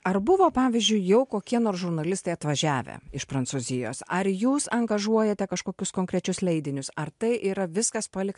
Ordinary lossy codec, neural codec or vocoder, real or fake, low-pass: MP3, 64 kbps; none; real; 10.8 kHz